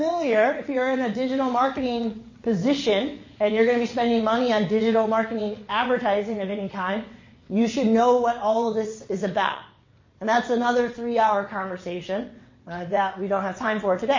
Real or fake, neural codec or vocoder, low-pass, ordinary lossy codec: fake; vocoder, 22.05 kHz, 80 mel bands, WaveNeXt; 7.2 kHz; MP3, 32 kbps